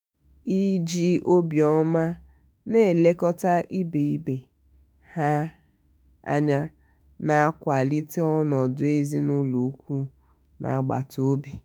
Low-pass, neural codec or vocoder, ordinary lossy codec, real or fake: none; autoencoder, 48 kHz, 32 numbers a frame, DAC-VAE, trained on Japanese speech; none; fake